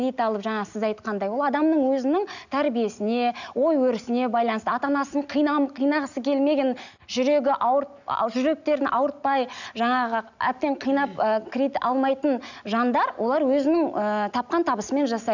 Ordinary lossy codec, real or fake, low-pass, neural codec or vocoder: none; real; 7.2 kHz; none